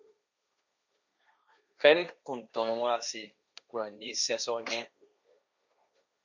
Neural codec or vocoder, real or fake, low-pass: codec, 16 kHz, 1.1 kbps, Voila-Tokenizer; fake; 7.2 kHz